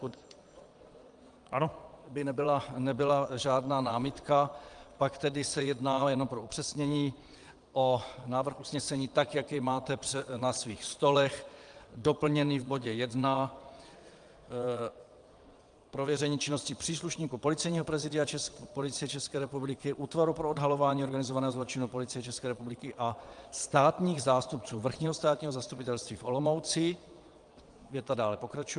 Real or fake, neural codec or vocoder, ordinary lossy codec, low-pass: fake; vocoder, 22.05 kHz, 80 mel bands, Vocos; Opus, 32 kbps; 9.9 kHz